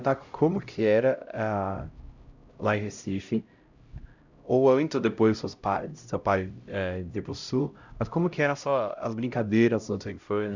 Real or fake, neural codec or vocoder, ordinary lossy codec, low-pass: fake; codec, 16 kHz, 0.5 kbps, X-Codec, HuBERT features, trained on LibriSpeech; Opus, 64 kbps; 7.2 kHz